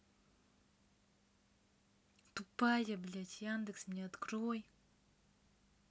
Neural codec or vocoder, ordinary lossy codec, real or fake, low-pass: none; none; real; none